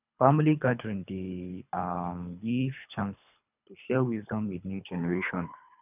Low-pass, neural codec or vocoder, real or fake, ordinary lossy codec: 3.6 kHz; codec, 24 kHz, 3 kbps, HILCodec; fake; none